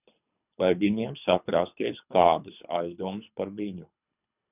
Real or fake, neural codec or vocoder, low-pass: fake; codec, 24 kHz, 3 kbps, HILCodec; 3.6 kHz